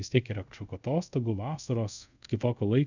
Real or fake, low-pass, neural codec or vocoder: fake; 7.2 kHz; codec, 24 kHz, 0.5 kbps, DualCodec